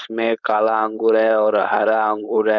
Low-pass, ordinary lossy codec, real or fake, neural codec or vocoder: 7.2 kHz; none; fake; codec, 16 kHz, 4.8 kbps, FACodec